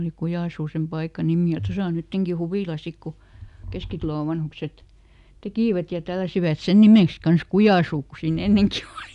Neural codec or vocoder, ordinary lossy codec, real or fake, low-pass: none; none; real; 10.8 kHz